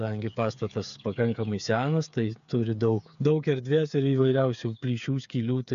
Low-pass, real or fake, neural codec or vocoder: 7.2 kHz; fake; codec, 16 kHz, 8 kbps, FreqCodec, smaller model